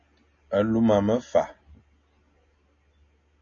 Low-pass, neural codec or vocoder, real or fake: 7.2 kHz; none; real